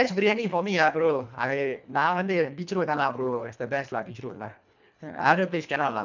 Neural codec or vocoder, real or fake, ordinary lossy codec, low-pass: codec, 24 kHz, 1.5 kbps, HILCodec; fake; none; 7.2 kHz